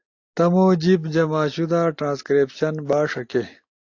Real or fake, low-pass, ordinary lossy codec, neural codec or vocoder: real; 7.2 kHz; AAC, 48 kbps; none